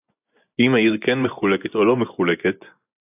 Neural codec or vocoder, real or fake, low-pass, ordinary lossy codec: vocoder, 24 kHz, 100 mel bands, Vocos; fake; 3.6 kHz; AAC, 32 kbps